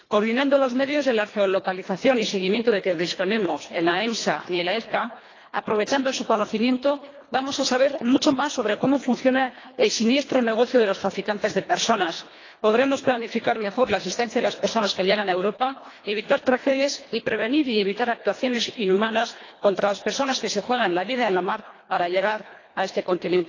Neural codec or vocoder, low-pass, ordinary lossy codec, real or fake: codec, 24 kHz, 1.5 kbps, HILCodec; 7.2 kHz; AAC, 32 kbps; fake